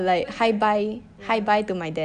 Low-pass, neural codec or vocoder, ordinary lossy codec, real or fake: 9.9 kHz; none; AAC, 96 kbps; real